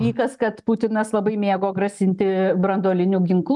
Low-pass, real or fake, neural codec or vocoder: 10.8 kHz; real; none